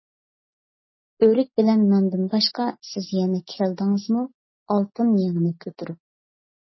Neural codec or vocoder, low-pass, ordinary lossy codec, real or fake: none; 7.2 kHz; MP3, 24 kbps; real